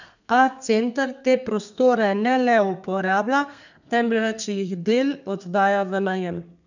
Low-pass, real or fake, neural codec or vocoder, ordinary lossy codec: 7.2 kHz; fake; codec, 32 kHz, 1.9 kbps, SNAC; none